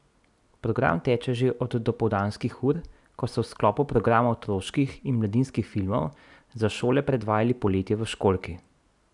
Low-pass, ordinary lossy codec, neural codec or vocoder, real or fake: 10.8 kHz; none; none; real